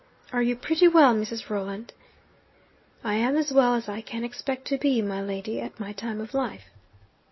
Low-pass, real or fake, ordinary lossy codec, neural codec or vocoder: 7.2 kHz; real; MP3, 24 kbps; none